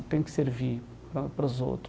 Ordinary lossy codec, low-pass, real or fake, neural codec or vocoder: none; none; real; none